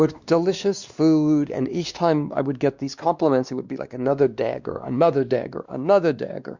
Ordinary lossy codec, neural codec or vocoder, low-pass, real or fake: Opus, 64 kbps; codec, 16 kHz, 2 kbps, X-Codec, WavLM features, trained on Multilingual LibriSpeech; 7.2 kHz; fake